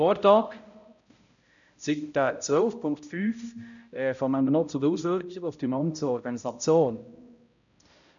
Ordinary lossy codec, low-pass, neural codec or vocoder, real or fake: none; 7.2 kHz; codec, 16 kHz, 0.5 kbps, X-Codec, HuBERT features, trained on balanced general audio; fake